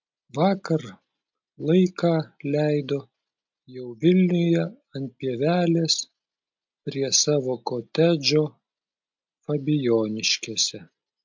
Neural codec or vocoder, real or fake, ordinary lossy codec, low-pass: none; real; MP3, 64 kbps; 7.2 kHz